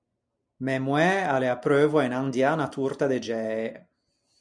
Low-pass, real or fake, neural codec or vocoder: 9.9 kHz; real; none